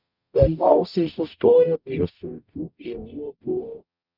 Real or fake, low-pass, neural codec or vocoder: fake; 5.4 kHz; codec, 44.1 kHz, 0.9 kbps, DAC